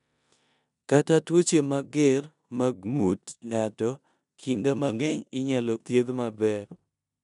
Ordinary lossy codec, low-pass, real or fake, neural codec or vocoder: none; 10.8 kHz; fake; codec, 16 kHz in and 24 kHz out, 0.9 kbps, LongCat-Audio-Codec, four codebook decoder